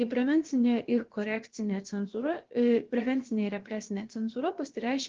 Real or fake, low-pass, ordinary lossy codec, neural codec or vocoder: fake; 7.2 kHz; Opus, 16 kbps; codec, 16 kHz, about 1 kbps, DyCAST, with the encoder's durations